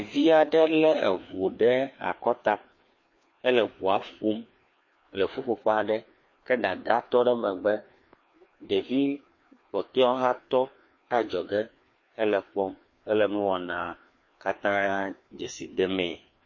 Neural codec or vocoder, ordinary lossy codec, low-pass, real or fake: codec, 16 kHz, 2 kbps, FreqCodec, larger model; MP3, 32 kbps; 7.2 kHz; fake